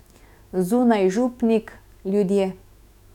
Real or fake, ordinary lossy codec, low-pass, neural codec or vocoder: fake; none; 19.8 kHz; autoencoder, 48 kHz, 128 numbers a frame, DAC-VAE, trained on Japanese speech